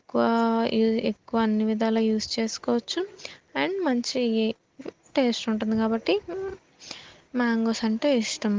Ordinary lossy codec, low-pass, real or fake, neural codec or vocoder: Opus, 16 kbps; 7.2 kHz; real; none